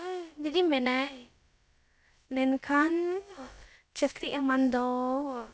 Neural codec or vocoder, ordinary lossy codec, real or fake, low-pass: codec, 16 kHz, about 1 kbps, DyCAST, with the encoder's durations; none; fake; none